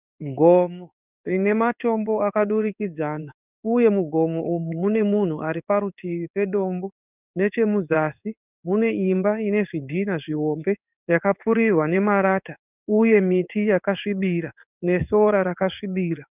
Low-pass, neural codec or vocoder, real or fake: 3.6 kHz; codec, 16 kHz in and 24 kHz out, 1 kbps, XY-Tokenizer; fake